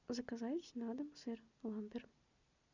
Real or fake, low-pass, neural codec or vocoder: real; 7.2 kHz; none